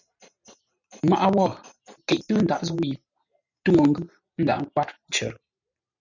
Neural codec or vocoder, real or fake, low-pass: vocoder, 44.1 kHz, 128 mel bands every 512 samples, BigVGAN v2; fake; 7.2 kHz